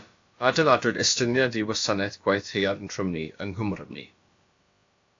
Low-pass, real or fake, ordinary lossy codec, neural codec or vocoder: 7.2 kHz; fake; AAC, 48 kbps; codec, 16 kHz, about 1 kbps, DyCAST, with the encoder's durations